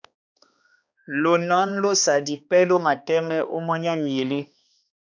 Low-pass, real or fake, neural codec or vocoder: 7.2 kHz; fake; codec, 16 kHz, 2 kbps, X-Codec, HuBERT features, trained on balanced general audio